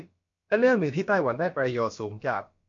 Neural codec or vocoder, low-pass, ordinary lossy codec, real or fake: codec, 16 kHz, about 1 kbps, DyCAST, with the encoder's durations; 7.2 kHz; MP3, 48 kbps; fake